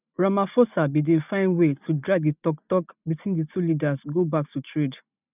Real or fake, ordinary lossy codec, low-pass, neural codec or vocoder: real; none; 3.6 kHz; none